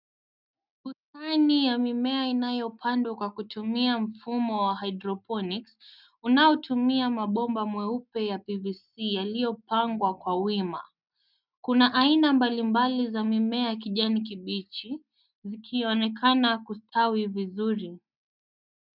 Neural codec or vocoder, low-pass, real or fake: none; 5.4 kHz; real